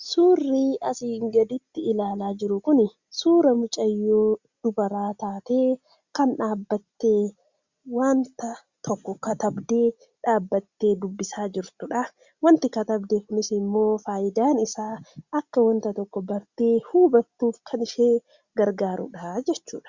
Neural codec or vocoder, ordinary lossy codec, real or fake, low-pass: none; Opus, 64 kbps; real; 7.2 kHz